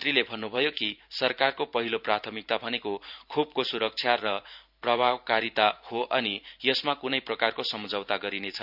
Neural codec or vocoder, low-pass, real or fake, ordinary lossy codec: vocoder, 44.1 kHz, 128 mel bands every 512 samples, BigVGAN v2; 5.4 kHz; fake; none